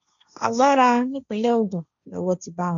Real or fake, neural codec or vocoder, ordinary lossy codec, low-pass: fake; codec, 16 kHz, 1.1 kbps, Voila-Tokenizer; none; 7.2 kHz